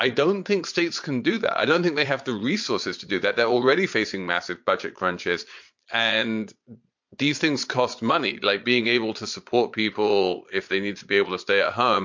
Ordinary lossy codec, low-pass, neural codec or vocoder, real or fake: MP3, 48 kbps; 7.2 kHz; vocoder, 22.05 kHz, 80 mel bands, Vocos; fake